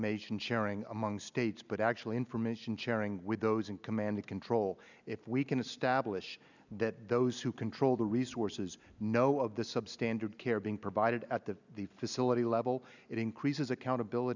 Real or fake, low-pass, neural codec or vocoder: real; 7.2 kHz; none